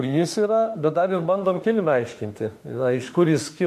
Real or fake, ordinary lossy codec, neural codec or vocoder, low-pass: fake; MP3, 64 kbps; autoencoder, 48 kHz, 32 numbers a frame, DAC-VAE, trained on Japanese speech; 14.4 kHz